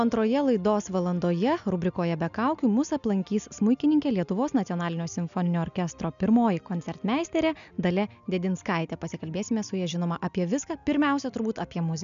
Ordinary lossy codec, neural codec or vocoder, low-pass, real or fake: MP3, 96 kbps; none; 7.2 kHz; real